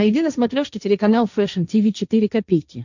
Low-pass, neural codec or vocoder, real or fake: 7.2 kHz; codec, 16 kHz, 1.1 kbps, Voila-Tokenizer; fake